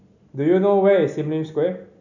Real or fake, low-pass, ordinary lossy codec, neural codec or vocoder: real; 7.2 kHz; none; none